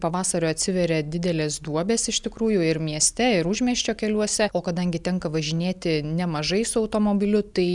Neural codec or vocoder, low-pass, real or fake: none; 10.8 kHz; real